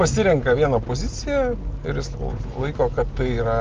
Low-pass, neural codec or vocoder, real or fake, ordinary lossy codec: 7.2 kHz; none; real; Opus, 32 kbps